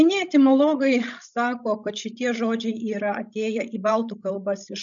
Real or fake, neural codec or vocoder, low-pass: fake; codec, 16 kHz, 16 kbps, FreqCodec, larger model; 7.2 kHz